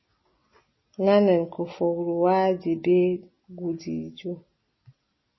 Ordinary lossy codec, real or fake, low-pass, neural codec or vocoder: MP3, 24 kbps; real; 7.2 kHz; none